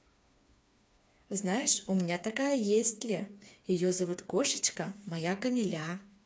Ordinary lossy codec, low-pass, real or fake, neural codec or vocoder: none; none; fake; codec, 16 kHz, 4 kbps, FreqCodec, smaller model